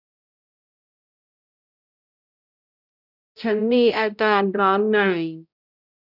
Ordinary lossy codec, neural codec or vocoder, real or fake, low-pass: none; codec, 16 kHz, 0.5 kbps, X-Codec, HuBERT features, trained on balanced general audio; fake; 5.4 kHz